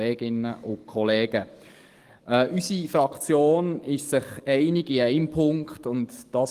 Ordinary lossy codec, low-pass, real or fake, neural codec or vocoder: Opus, 24 kbps; 14.4 kHz; fake; codec, 44.1 kHz, 7.8 kbps, DAC